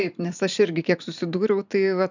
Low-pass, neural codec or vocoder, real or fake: 7.2 kHz; vocoder, 44.1 kHz, 128 mel bands every 512 samples, BigVGAN v2; fake